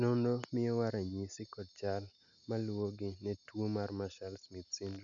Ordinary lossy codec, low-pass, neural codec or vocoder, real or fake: none; 7.2 kHz; none; real